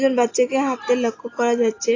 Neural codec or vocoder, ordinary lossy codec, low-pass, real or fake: none; AAC, 32 kbps; 7.2 kHz; real